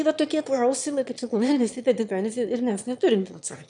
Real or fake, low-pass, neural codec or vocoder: fake; 9.9 kHz; autoencoder, 22.05 kHz, a latent of 192 numbers a frame, VITS, trained on one speaker